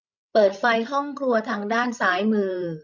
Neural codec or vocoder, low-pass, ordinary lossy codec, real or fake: codec, 16 kHz, 16 kbps, FreqCodec, larger model; 7.2 kHz; none; fake